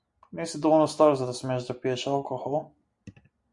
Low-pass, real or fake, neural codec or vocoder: 10.8 kHz; real; none